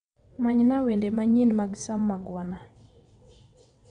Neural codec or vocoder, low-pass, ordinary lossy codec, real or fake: vocoder, 22.05 kHz, 80 mel bands, WaveNeXt; 9.9 kHz; none; fake